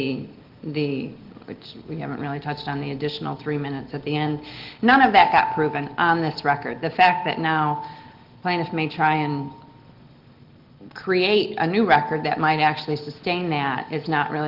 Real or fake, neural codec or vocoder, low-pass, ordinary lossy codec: real; none; 5.4 kHz; Opus, 16 kbps